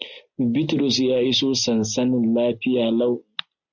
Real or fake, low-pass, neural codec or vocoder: real; 7.2 kHz; none